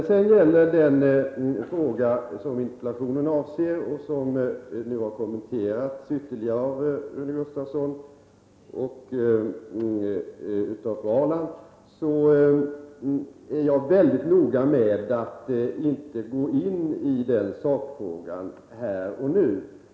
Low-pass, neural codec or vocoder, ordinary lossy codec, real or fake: none; none; none; real